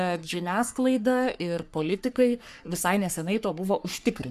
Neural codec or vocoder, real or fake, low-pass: codec, 44.1 kHz, 3.4 kbps, Pupu-Codec; fake; 14.4 kHz